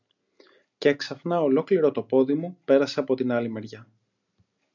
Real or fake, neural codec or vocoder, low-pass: real; none; 7.2 kHz